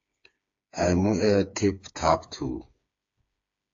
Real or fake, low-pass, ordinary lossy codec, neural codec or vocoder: fake; 7.2 kHz; AAC, 64 kbps; codec, 16 kHz, 4 kbps, FreqCodec, smaller model